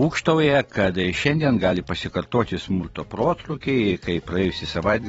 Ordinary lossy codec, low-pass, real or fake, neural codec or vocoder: AAC, 24 kbps; 19.8 kHz; fake; vocoder, 44.1 kHz, 128 mel bands every 256 samples, BigVGAN v2